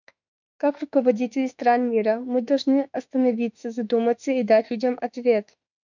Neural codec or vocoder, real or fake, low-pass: autoencoder, 48 kHz, 32 numbers a frame, DAC-VAE, trained on Japanese speech; fake; 7.2 kHz